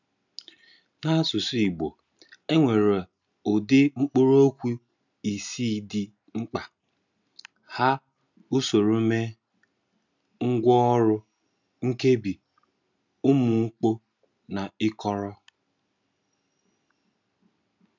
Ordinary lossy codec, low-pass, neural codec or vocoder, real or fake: none; 7.2 kHz; none; real